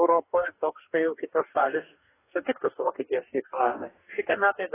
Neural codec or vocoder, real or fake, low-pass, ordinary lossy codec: codec, 44.1 kHz, 2.6 kbps, DAC; fake; 3.6 kHz; AAC, 16 kbps